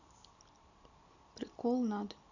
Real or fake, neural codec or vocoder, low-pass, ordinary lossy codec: real; none; 7.2 kHz; none